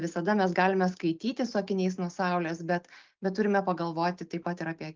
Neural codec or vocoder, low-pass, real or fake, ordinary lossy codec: none; 7.2 kHz; real; Opus, 32 kbps